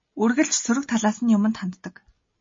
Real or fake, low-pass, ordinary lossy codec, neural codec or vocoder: real; 7.2 kHz; MP3, 32 kbps; none